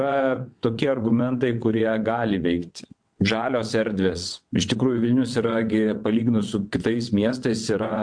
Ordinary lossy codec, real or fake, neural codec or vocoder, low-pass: MP3, 64 kbps; fake; vocoder, 22.05 kHz, 80 mel bands, WaveNeXt; 9.9 kHz